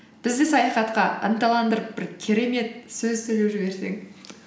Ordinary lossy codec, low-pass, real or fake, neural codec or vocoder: none; none; real; none